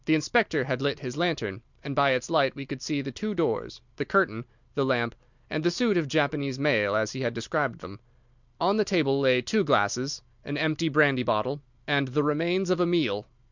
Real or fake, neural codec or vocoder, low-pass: real; none; 7.2 kHz